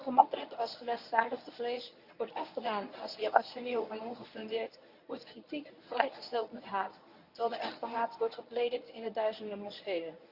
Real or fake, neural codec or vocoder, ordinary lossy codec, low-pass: fake; codec, 24 kHz, 0.9 kbps, WavTokenizer, medium speech release version 1; Opus, 64 kbps; 5.4 kHz